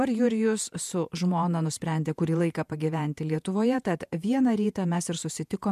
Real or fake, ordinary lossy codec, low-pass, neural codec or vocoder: fake; MP3, 96 kbps; 14.4 kHz; vocoder, 48 kHz, 128 mel bands, Vocos